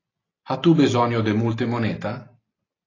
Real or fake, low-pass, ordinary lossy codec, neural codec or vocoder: real; 7.2 kHz; AAC, 32 kbps; none